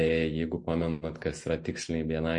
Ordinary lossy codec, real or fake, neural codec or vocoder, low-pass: MP3, 48 kbps; real; none; 10.8 kHz